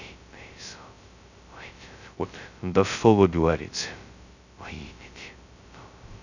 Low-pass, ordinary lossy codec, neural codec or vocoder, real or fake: 7.2 kHz; none; codec, 16 kHz, 0.2 kbps, FocalCodec; fake